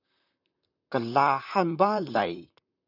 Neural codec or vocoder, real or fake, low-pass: vocoder, 44.1 kHz, 128 mel bands, Pupu-Vocoder; fake; 5.4 kHz